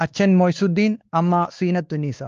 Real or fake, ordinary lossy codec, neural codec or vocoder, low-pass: fake; Opus, 32 kbps; codec, 16 kHz, 2 kbps, X-Codec, WavLM features, trained on Multilingual LibriSpeech; 7.2 kHz